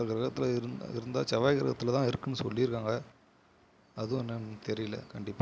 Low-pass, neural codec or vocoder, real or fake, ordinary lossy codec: none; none; real; none